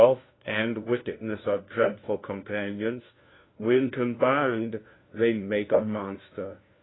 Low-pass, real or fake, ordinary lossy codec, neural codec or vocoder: 7.2 kHz; fake; AAC, 16 kbps; codec, 16 kHz, 1 kbps, FunCodec, trained on LibriTTS, 50 frames a second